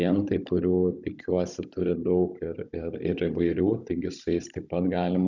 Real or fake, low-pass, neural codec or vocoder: fake; 7.2 kHz; codec, 16 kHz, 16 kbps, FunCodec, trained on Chinese and English, 50 frames a second